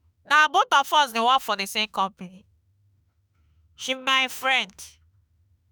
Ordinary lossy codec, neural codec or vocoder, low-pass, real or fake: none; autoencoder, 48 kHz, 32 numbers a frame, DAC-VAE, trained on Japanese speech; none; fake